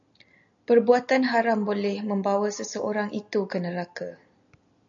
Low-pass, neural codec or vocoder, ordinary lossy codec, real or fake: 7.2 kHz; none; AAC, 64 kbps; real